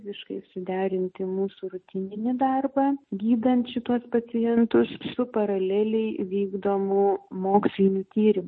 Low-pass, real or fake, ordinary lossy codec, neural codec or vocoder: 10.8 kHz; real; MP3, 32 kbps; none